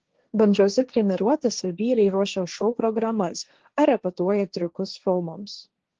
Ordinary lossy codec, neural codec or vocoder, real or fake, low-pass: Opus, 16 kbps; codec, 16 kHz, 1.1 kbps, Voila-Tokenizer; fake; 7.2 kHz